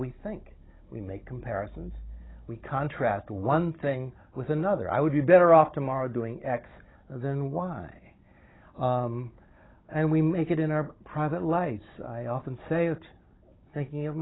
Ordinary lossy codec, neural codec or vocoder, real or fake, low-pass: AAC, 16 kbps; codec, 16 kHz, 16 kbps, FunCodec, trained on Chinese and English, 50 frames a second; fake; 7.2 kHz